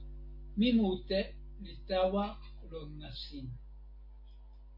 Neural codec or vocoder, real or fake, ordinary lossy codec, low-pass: none; real; MP3, 32 kbps; 5.4 kHz